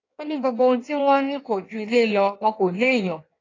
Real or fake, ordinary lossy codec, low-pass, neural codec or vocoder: fake; AAC, 32 kbps; 7.2 kHz; codec, 16 kHz in and 24 kHz out, 1.1 kbps, FireRedTTS-2 codec